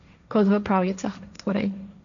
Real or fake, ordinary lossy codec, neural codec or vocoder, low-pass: fake; none; codec, 16 kHz, 1.1 kbps, Voila-Tokenizer; 7.2 kHz